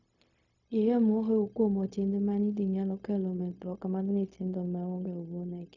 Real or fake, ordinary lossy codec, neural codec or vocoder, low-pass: fake; none; codec, 16 kHz, 0.4 kbps, LongCat-Audio-Codec; 7.2 kHz